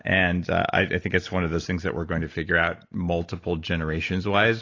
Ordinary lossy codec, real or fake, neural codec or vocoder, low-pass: AAC, 32 kbps; real; none; 7.2 kHz